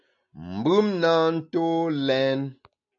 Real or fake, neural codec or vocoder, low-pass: real; none; 7.2 kHz